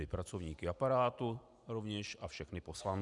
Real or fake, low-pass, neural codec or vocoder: real; 10.8 kHz; none